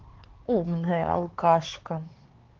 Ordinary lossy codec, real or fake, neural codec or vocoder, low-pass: Opus, 16 kbps; fake; codec, 16 kHz, 4 kbps, X-Codec, HuBERT features, trained on LibriSpeech; 7.2 kHz